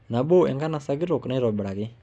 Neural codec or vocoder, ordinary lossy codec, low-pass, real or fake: none; none; none; real